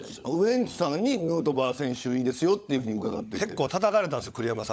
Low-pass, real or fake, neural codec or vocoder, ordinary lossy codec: none; fake; codec, 16 kHz, 16 kbps, FunCodec, trained on LibriTTS, 50 frames a second; none